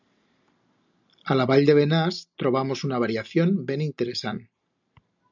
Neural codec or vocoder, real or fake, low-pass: none; real; 7.2 kHz